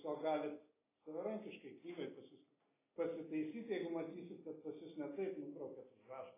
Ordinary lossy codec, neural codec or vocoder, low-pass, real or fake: AAC, 16 kbps; none; 3.6 kHz; real